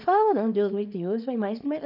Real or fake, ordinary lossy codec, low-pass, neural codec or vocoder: fake; none; 5.4 kHz; codec, 24 kHz, 0.9 kbps, WavTokenizer, small release